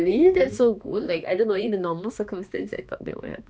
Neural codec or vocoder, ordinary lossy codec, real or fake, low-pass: codec, 16 kHz, 2 kbps, X-Codec, HuBERT features, trained on balanced general audio; none; fake; none